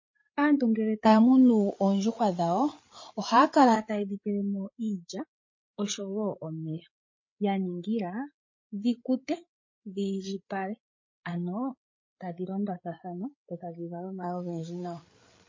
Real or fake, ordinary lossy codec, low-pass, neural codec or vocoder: fake; MP3, 32 kbps; 7.2 kHz; codec, 16 kHz, 8 kbps, FreqCodec, larger model